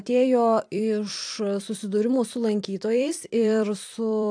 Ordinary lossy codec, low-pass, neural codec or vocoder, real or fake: AAC, 64 kbps; 9.9 kHz; vocoder, 44.1 kHz, 128 mel bands every 256 samples, BigVGAN v2; fake